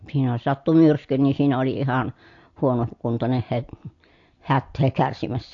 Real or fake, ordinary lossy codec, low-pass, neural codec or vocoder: real; AAC, 48 kbps; 7.2 kHz; none